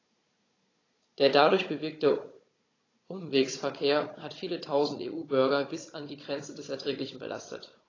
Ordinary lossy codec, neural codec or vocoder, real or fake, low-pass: AAC, 32 kbps; codec, 16 kHz, 16 kbps, FunCodec, trained on Chinese and English, 50 frames a second; fake; 7.2 kHz